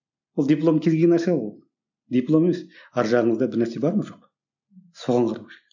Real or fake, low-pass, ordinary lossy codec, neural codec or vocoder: real; 7.2 kHz; none; none